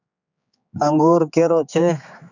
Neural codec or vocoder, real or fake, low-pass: codec, 16 kHz, 4 kbps, X-Codec, HuBERT features, trained on general audio; fake; 7.2 kHz